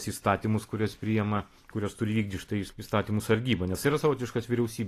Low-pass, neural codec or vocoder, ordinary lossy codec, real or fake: 14.4 kHz; none; AAC, 48 kbps; real